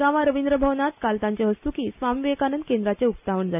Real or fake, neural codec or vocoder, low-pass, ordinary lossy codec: real; none; 3.6 kHz; none